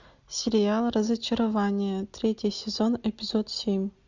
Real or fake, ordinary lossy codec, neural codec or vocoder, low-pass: real; Opus, 64 kbps; none; 7.2 kHz